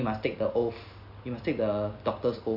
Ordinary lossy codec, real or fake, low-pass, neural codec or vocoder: AAC, 48 kbps; real; 5.4 kHz; none